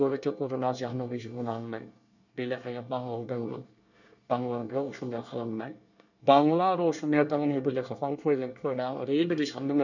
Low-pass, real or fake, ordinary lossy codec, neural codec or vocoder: 7.2 kHz; fake; none; codec, 24 kHz, 1 kbps, SNAC